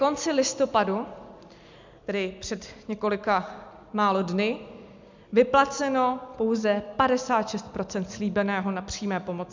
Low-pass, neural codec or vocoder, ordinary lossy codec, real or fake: 7.2 kHz; none; MP3, 64 kbps; real